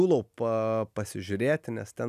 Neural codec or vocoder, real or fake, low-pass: none; real; 14.4 kHz